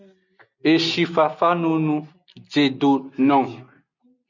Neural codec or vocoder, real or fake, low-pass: none; real; 7.2 kHz